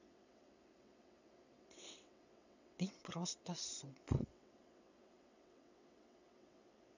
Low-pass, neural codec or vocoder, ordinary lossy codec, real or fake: 7.2 kHz; vocoder, 22.05 kHz, 80 mel bands, WaveNeXt; none; fake